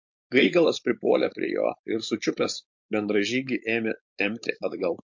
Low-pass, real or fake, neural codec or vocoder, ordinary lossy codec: 7.2 kHz; fake; codec, 16 kHz, 4.8 kbps, FACodec; MP3, 48 kbps